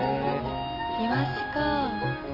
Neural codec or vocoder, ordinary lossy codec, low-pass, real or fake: none; none; 5.4 kHz; real